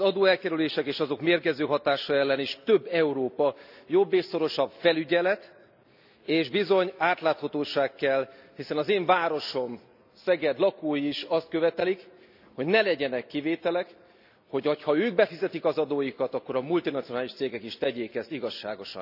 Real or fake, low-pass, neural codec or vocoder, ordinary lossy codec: real; 5.4 kHz; none; none